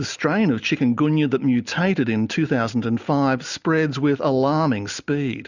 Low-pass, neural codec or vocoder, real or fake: 7.2 kHz; none; real